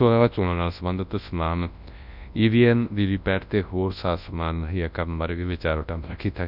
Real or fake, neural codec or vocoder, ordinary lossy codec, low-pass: fake; codec, 24 kHz, 0.9 kbps, WavTokenizer, large speech release; none; 5.4 kHz